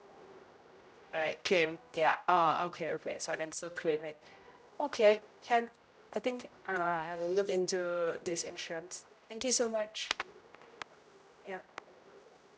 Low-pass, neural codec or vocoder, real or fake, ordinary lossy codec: none; codec, 16 kHz, 0.5 kbps, X-Codec, HuBERT features, trained on general audio; fake; none